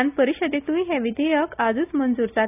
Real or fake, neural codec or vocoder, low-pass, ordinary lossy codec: real; none; 3.6 kHz; none